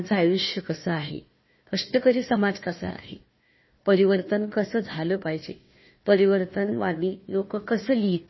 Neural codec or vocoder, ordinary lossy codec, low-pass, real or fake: codec, 16 kHz, 1 kbps, FunCodec, trained on Chinese and English, 50 frames a second; MP3, 24 kbps; 7.2 kHz; fake